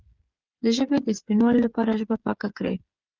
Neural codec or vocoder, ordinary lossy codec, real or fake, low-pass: codec, 16 kHz, 8 kbps, FreqCodec, smaller model; Opus, 24 kbps; fake; 7.2 kHz